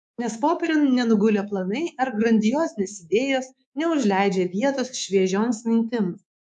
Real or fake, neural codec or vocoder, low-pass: fake; codec, 24 kHz, 3.1 kbps, DualCodec; 10.8 kHz